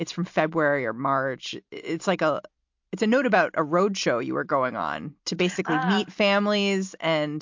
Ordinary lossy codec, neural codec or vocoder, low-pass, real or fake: MP3, 48 kbps; none; 7.2 kHz; real